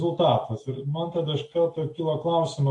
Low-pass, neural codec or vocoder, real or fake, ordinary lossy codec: 10.8 kHz; none; real; AAC, 48 kbps